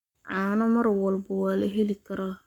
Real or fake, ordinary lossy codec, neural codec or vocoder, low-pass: fake; none; codec, 44.1 kHz, 7.8 kbps, Pupu-Codec; 19.8 kHz